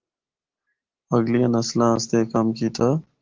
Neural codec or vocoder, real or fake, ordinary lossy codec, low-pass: none; real; Opus, 16 kbps; 7.2 kHz